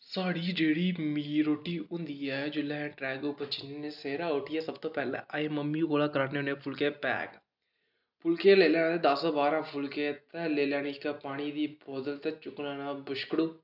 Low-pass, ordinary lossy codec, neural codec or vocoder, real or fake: 5.4 kHz; none; none; real